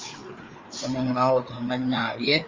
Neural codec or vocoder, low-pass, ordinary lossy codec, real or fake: codec, 16 kHz, 4 kbps, FreqCodec, larger model; 7.2 kHz; Opus, 32 kbps; fake